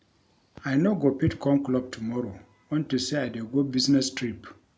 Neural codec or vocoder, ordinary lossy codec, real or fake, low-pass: none; none; real; none